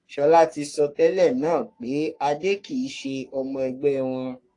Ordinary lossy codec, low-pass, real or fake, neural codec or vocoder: AAC, 48 kbps; 10.8 kHz; fake; codec, 44.1 kHz, 3.4 kbps, Pupu-Codec